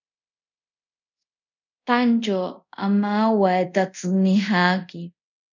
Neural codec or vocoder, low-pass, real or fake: codec, 24 kHz, 0.5 kbps, DualCodec; 7.2 kHz; fake